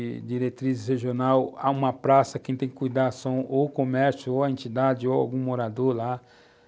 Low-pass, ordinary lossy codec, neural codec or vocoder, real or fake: none; none; none; real